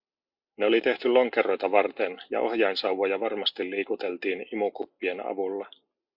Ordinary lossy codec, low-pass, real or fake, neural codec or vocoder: MP3, 48 kbps; 5.4 kHz; real; none